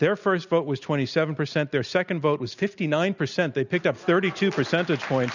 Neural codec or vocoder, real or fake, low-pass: none; real; 7.2 kHz